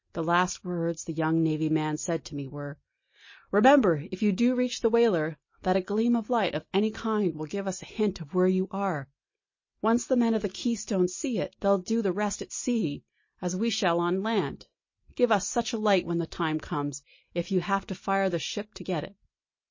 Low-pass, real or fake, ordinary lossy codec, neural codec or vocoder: 7.2 kHz; real; MP3, 32 kbps; none